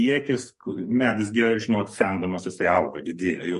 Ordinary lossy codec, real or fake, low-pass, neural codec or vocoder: MP3, 48 kbps; fake; 14.4 kHz; codec, 44.1 kHz, 2.6 kbps, SNAC